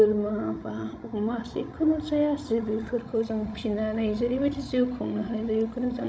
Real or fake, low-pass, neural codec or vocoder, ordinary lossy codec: fake; none; codec, 16 kHz, 16 kbps, FreqCodec, larger model; none